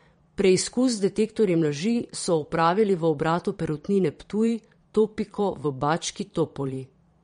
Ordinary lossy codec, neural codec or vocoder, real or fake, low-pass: MP3, 48 kbps; vocoder, 22.05 kHz, 80 mel bands, Vocos; fake; 9.9 kHz